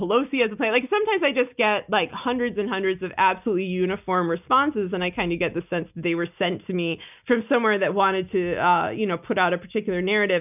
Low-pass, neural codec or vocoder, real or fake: 3.6 kHz; none; real